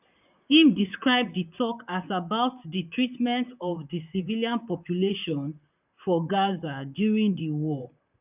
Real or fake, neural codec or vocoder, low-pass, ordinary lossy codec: fake; vocoder, 44.1 kHz, 128 mel bands, Pupu-Vocoder; 3.6 kHz; none